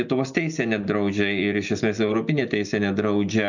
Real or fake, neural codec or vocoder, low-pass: real; none; 7.2 kHz